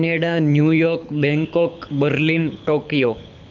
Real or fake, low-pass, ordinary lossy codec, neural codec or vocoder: fake; 7.2 kHz; none; codec, 24 kHz, 6 kbps, HILCodec